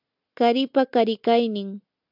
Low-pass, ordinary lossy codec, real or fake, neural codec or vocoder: 5.4 kHz; AAC, 48 kbps; real; none